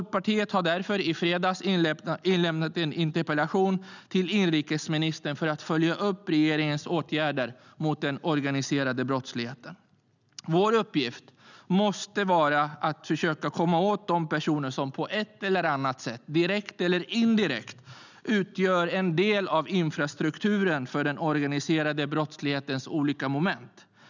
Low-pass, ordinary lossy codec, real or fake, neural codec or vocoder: 7.2 kHz; none; real; none